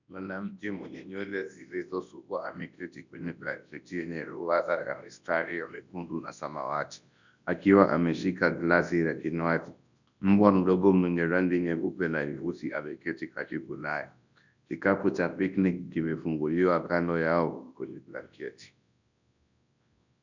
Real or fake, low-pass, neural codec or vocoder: fake; 7.2 kHz; codec, 24 kHz, 0.9 kbps, WavTokenizer, large speech release